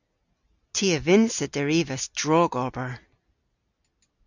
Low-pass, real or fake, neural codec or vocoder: 7.2 kHz; real; none